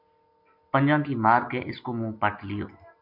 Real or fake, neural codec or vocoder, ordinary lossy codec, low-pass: real; none; MP3, 48 kbps; 5.4 kHz